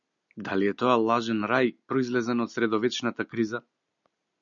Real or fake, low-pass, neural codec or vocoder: real; 7.2 kHz; none